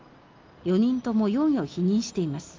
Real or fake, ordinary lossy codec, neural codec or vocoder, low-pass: real; Opus, 24 kbps; none; 7.2 kHz